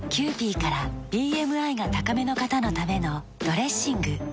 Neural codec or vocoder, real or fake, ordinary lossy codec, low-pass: none; real; none; none